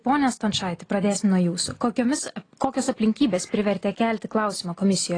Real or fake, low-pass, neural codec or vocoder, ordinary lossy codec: real; 9.9 kHz; none; AAC, 32 kbps